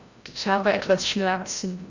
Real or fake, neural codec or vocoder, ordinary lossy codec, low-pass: fake; codec, 16 kHz, 0.5 kbps, FreqCodec, larger model; Opus, 64 kbps; 7.2 kHz